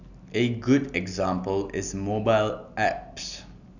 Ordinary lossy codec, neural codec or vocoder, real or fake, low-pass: none; none; real; 7.2 kHz